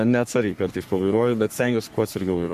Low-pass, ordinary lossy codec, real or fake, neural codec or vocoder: 14.4 kHz; MP3, 64 kbps; fake; codec, 44.1 kHz, 3.4 kbps, Pupu-Codec